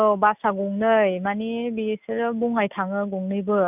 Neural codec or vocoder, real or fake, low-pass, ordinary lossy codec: none; real; 3.6 kHz; none